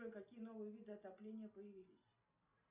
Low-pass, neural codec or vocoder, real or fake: 3.6 kHz; none; real